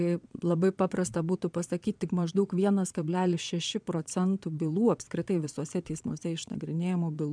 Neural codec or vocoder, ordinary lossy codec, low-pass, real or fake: vocoder, 22.05 kHz, 80 mel bands, Vocos; MP3, 96 kbps; 9.9 kHz; fake